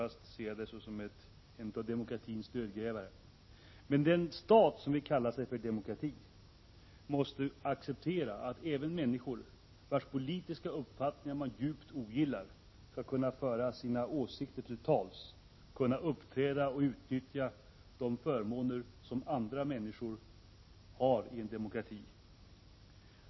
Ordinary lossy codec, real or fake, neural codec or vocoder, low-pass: MP3, 24 kbps; real; none; 7.2 kHz